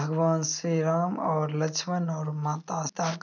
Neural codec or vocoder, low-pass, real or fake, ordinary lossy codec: none; 7.2 kHz; real; none